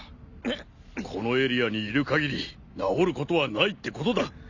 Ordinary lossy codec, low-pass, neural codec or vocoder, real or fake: none; 7.2 kHz; none; real